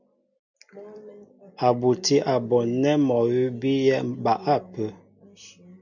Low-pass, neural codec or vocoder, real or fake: 7.2 kHz; none; real